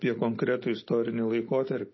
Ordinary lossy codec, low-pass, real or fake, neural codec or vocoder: MP3, 24 kbps; 7.2 kHz; real; none